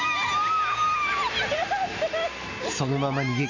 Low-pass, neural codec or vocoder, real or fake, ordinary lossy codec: 7.2 kHz; none; real; AAC, 32 kbps